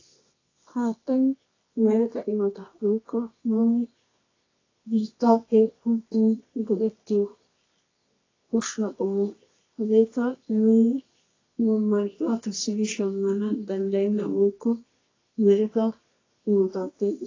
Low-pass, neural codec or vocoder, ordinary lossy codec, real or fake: 7.2 kHz; codec, 24 kHz, 0.9 kbps, WavTokenizer, medium music audio release; AAC, 32 kbps; fake